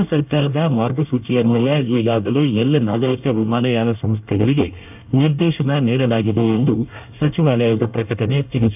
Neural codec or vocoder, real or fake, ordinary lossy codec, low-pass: codec, 24 kHz, 1 kbps, SNAC; fake; none; 3.6 kHz